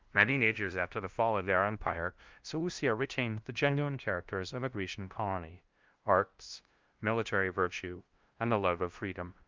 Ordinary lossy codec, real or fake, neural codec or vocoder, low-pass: Opus, 24 kbps; fake; codec, 16 kHz, 0.5 kbps, FunCodec, trained on LibriTTS, 25 frames a second; 7.2 kHz